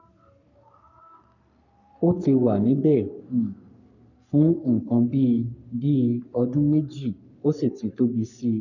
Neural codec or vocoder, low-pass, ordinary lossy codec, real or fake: codec, 44.1 kHz, 3.4 kbps, Pupu-Codec; 7.2 kHz; none; fake